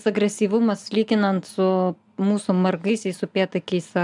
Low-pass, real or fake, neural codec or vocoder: 10.8 kHz; real; none